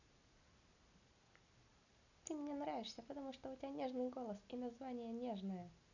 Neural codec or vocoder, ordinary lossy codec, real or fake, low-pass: none; none; real; 7.2 kHz